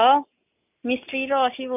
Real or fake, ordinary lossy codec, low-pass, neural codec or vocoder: real; none; 3.6 kHz; none